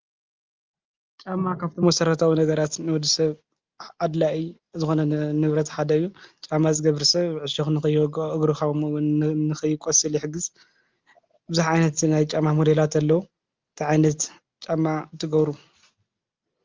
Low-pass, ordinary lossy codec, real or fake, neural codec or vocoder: 7.2 kHz; Opus, 24 kbps; real; none